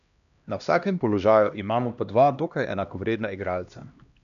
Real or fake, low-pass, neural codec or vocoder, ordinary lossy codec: fake; 7.2 kHz; codec, 16 kHz, 1 kbps, X-Codec, HuBERT features, trained on LibriSpeech; none